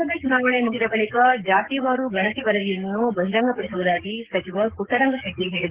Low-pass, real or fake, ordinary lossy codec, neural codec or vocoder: 3.6 kHz; fake; Opus, 16 kbps; codec, 44.1 kHz, 7.8 kbps, DAC